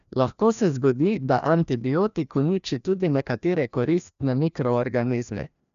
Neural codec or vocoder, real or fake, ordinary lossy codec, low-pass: codec, 16 kHz, 1 kbps, FreqCodec, larger model; fake; none; 7.2 kHz